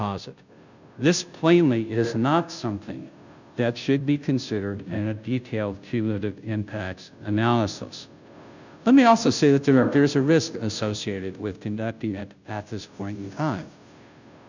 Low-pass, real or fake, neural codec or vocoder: 7.2 kHz; fake; codec, 16 kHz, 0.5 kbps, FunCodec, trained on Chinese and English, 25 frames a second